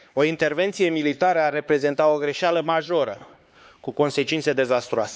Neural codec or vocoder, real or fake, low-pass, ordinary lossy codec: codec, 16 kHz, 4 kbps, X-Codec, HuBERT features, trained on LibriSpeech; fake; none; none